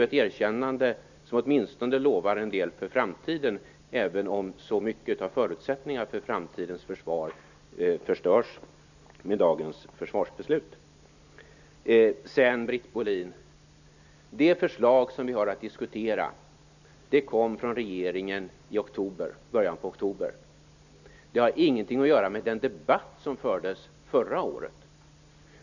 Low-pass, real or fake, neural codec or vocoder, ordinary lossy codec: 7.2 kHz; real; none; none